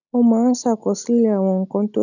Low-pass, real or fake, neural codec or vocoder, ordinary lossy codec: 7.2 kHz; real; none; none